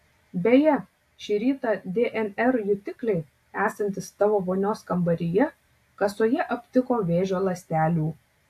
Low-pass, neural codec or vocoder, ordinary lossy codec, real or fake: 14.4 kHz; none; AAC, 64 kbps; real